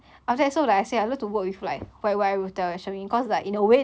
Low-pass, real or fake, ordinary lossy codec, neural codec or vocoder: none; real; none; none